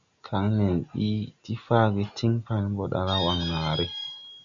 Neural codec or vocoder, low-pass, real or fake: none; 7.2 kHz; real